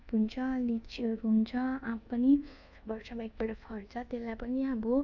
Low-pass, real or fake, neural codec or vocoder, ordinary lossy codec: 7.2 kHz; fake; codec, 24 kHz, 1.2 kbps, DualCodec; none